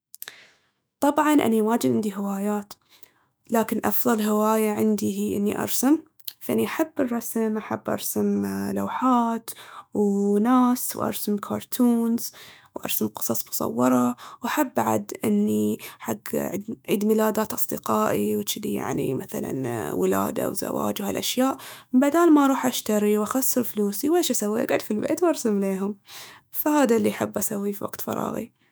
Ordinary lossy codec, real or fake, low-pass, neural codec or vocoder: none; fake; none; autoencoder, 48 kHz, 128 numbers a frame, DAC-VAE, trained on Japanese speech